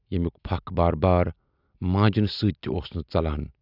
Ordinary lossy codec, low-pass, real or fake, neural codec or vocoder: none; 5.4 kHz; real; none